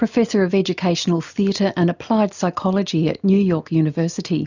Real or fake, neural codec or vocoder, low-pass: real; none; 7.2 kHz